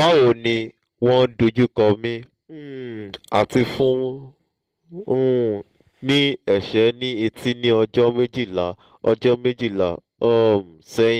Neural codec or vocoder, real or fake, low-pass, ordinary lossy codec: none; real; 14.4 kHz; Opus, 16 kbps